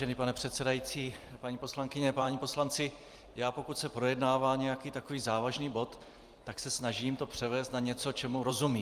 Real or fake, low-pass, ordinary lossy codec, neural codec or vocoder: fake; 14.4 kHz; Opus, 32 kbps; vocoder, 44.1 kHz, 128 mel bands every 512 samples, BigVGAN v2